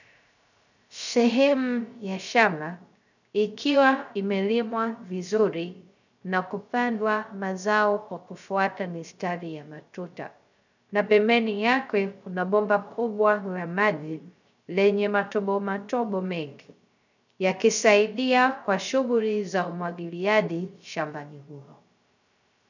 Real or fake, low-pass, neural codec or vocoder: fake; 7.2 kHz; codec, 16 kHz, 0.3 kbps, FocalCodec